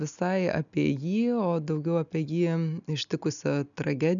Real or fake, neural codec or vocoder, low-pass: real; none; 7.2 kHz